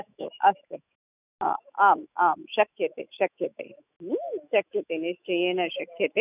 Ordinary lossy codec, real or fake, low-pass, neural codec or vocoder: none; fake; 3.6 kHz; autoencoder, 48 kHz, 128 numbers a frame, DAC-VAE, trained on Japanese speech